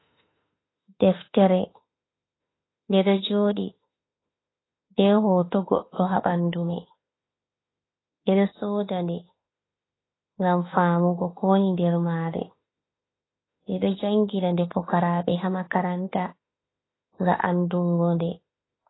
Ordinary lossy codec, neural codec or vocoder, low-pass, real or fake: AAC, 16 kbps; autoencoder, 48 kHz, 32 numbers a frame, DAC-VAE, trained on Japanese speech; 7.2 kHz; fake